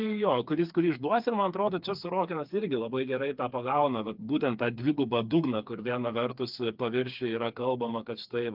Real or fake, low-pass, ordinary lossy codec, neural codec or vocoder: fake; 5.4 kHz; Opus, 32 kbps; codec, 16 kHz, 4 kbps, FreqCodec, smaller model